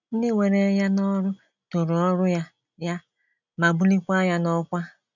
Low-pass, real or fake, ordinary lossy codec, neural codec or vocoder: 7.2 kHz; real; none; none